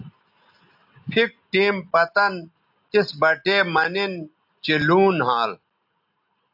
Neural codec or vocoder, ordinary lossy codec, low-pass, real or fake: none; AAC, 48 kbps; 5.4 kHz; real